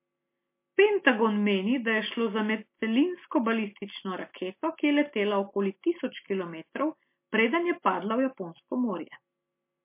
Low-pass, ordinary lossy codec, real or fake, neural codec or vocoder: 3.6 kHz; MP3, 24 kbps; real; none